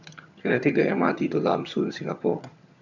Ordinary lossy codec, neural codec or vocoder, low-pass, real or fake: none; vocoder, 22.05 kHz, 80 mel bands, HiFi-GAN; 7.2 kHz; fake